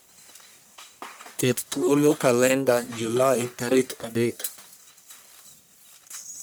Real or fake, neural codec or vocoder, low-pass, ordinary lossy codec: fake; codec, 44.1 kHz, 1.7 kbps, Pupu-Codec; none; none